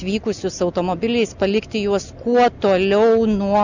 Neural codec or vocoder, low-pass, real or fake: none; 7.2 kHz; real